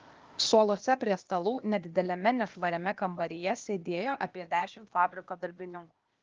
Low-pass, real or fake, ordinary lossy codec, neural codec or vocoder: 7.2 kHz; fake; Opus, 24 kbps; codec, 16 kHz, 0.8 kbps, ZipCodec